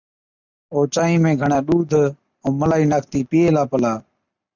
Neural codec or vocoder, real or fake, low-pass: none; real; 7.2 kHz